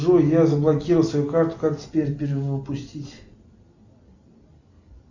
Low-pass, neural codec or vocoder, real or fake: 7.2 kHz; none; real